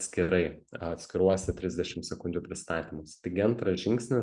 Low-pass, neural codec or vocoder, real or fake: 10.8 kHz; none; real